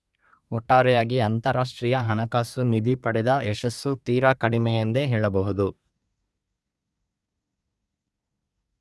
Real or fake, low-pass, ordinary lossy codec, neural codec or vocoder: fake; none; none; codec, 24 kHz, 1 kbps, SNAC